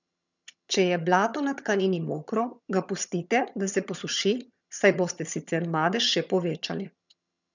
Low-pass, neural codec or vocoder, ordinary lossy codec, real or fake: 7.2 kHz; vocoder, 22.05 kHz, 80 mel bands, HiFi-GAN; none; fake